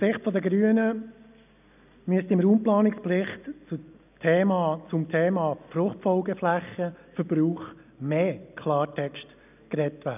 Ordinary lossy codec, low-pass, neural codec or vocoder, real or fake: none; 3.6 kHz; none; real